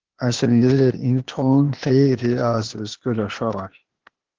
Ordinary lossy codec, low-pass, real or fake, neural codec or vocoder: Opus, 16 kbps; 7.2 kHz; fake; codec, 16 kHz, 0.8 kbps, ZipCodec